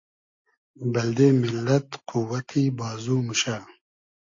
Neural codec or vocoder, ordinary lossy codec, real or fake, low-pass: none; AAC, 48 kbps; real; 7.2 kHz